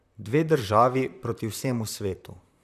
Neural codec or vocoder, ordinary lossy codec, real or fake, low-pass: vocoder, 44.1 kHz, 128 mel bands, Pupu-Vocoder; none; fake; 14.4 kHz